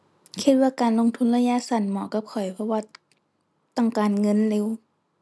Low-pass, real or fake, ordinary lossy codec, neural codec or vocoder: none; real; none; none